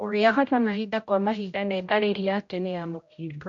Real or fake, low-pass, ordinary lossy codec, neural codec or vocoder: fake; 7.2 kHz; MP3, 48 kbps; codec, 16 kHz, 0.5 kbps, X-Codec, HuBERT features, trained on general audio